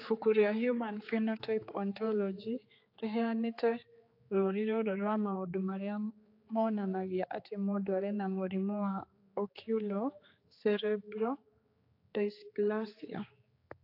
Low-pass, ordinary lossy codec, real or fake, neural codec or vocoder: 5.4 kHz; AAC, 32 kbps; fake; codec, 16 kHz, 4 kbps, X-Codec, HuBERT features, trained on general audio